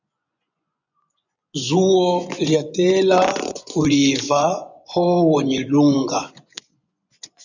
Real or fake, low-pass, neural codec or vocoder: fake; 7.2 kHz; vocoder, 24 kHz, 100 mel bands, Vocos